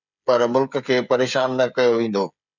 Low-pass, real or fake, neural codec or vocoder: 7.2 kHz; fake; codec, 16 kHz, 16 kbps, FreqCodec, smaller model